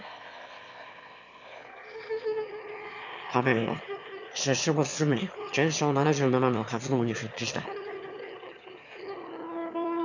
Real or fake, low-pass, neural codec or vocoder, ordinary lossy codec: fake; 7.2 kHz; autoencoder, 22.05 kHz, a latent of 192 numbers a frame, VITS, trained on one speaker; none